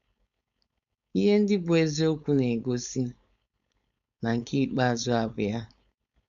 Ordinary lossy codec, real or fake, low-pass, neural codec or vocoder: none; fake; 7.2 kHz; codec, 16 kHz, 4.8 kbps, FACodec